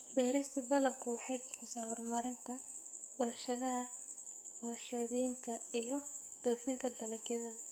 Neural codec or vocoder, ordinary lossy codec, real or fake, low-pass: codec, 44.1 kHz, 2.6 kbps, SNAC; none; fake; none